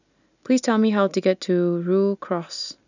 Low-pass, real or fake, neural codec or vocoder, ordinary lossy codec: 7.2 kHz; real; none; none